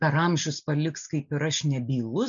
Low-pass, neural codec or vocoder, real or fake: 7.2 kHz; none; real